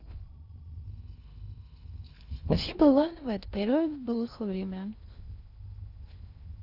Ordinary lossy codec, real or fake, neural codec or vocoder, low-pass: Opus, 64 kbps; fake; codec, 16 kHz in and 24 kHz out, 0.6 kbps, FocalCodec, streaming, 2048 codes; 5.4 kHz